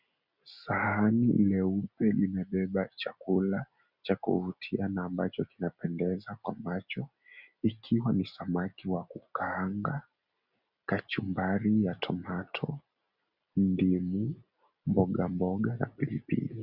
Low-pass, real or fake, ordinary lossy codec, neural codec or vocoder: 5.4 kHz; real; Opus, 64 kbps; none